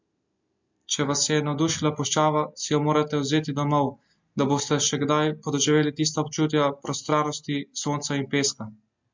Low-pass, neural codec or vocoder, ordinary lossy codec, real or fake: 7.2 kHz; none; MP3, 64 kbps; real